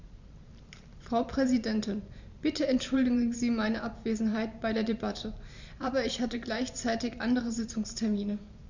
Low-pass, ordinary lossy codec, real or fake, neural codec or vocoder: 7.2 kHz; Opus, 64 kbps; fake; vocoder, 44.1 kHz, 128 mel bands every 512 samples, BigVGAN v2